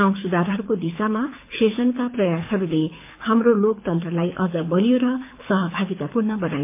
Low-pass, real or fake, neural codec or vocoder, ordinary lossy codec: 3.6 kHz; fake; codec, 24 kHz, 6 kbps, HILCodec; MP3, 24 kbps